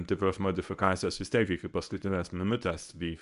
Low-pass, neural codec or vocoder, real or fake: 10.8 kHz; codec, 24 kHz, 0.9 kbps, WavTokenizer, medium speech release version 1; fake